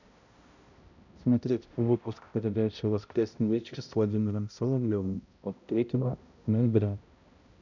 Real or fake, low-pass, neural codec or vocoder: fake; 7.2 kHz; codec, 16 kHz, 0.5 kbps, X-Codec, HuBERT features, trained on balanced general audio